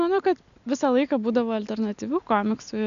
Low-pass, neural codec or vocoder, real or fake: 7.2 kHz; none; real